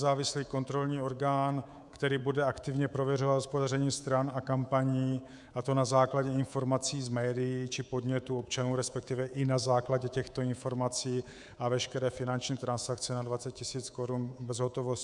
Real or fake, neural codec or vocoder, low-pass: fake; codec, 24 kHz, 3.1 kbps, DualCodec; 10.8 kHz